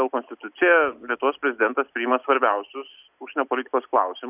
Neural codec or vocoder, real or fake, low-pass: none; real; 3.6 kHz